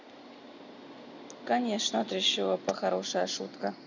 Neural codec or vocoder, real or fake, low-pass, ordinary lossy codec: none; real; 7.2 kHz; none